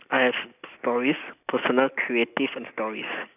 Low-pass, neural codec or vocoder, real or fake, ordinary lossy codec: 3.6 kHz; none; real; none